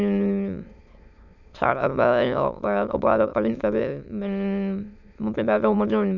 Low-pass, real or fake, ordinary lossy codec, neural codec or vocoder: 7.2 kHz; fake; none; autoencoder, 22.05 kHz, a latent of 192 numbers a frame, VITS, trained on many speakers